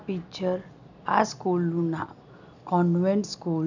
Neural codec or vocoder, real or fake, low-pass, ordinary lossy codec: none; real; 7.2 kHz; none